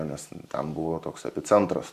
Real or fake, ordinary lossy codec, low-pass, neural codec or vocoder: fake; Opus, 64 kbps; 14.4 kHz; vocoder, 44.1 kHz, 128 mel bands every 512 samples, BigVGAN v2